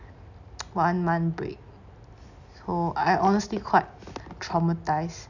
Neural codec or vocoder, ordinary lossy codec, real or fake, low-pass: none; none; real; 7.2 kHz